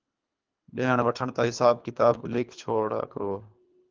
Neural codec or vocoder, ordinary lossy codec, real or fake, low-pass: codec, 24 kHz, 3 kbps, HILCodec; Opus, 24 kbps; fake; 7.2 kHz